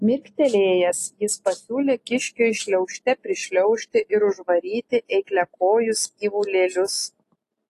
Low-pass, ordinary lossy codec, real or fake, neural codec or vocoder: 14.4 kHz; AAC, 48 kbps; real; none